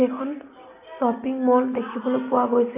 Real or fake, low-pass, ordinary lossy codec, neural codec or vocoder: real; 3.6 kHz; none; none